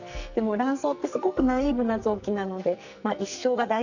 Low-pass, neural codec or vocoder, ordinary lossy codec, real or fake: 7.2 kHz; codec, 44.1 kHz, 2.6 kbps, SNAC; none; fake